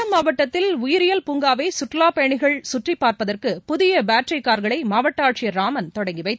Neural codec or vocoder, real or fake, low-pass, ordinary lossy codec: none; real; none; none